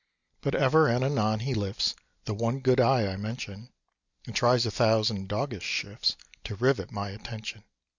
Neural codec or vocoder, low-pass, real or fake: none; 7.2 kHz; real